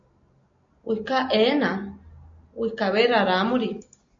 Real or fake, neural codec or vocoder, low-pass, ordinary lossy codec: real; none; 7.2 kHz; MP3, 48 kbps